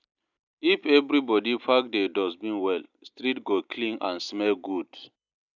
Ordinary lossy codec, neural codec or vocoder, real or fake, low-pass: none; none; real; 7.2 kHz